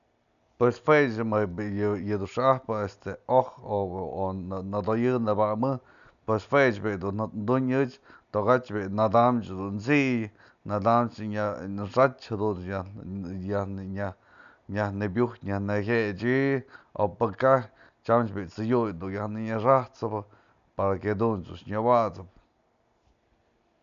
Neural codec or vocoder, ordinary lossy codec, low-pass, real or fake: none; none; 7.2 kHz; real